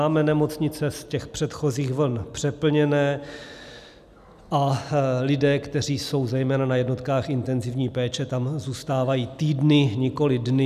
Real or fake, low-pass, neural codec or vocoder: real; 14.4 kHz; none